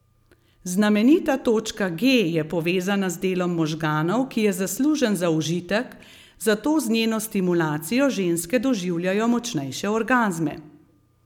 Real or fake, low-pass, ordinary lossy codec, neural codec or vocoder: real; 19.8 kHz; none; none